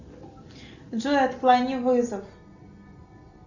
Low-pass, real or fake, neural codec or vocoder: 7.2 kHz; real; none